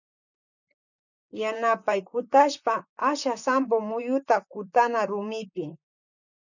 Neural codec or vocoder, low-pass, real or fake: vocoder, 44.1 kHz, 128 mel bands, Pupu-Vocoder; 7.2 kHz; fake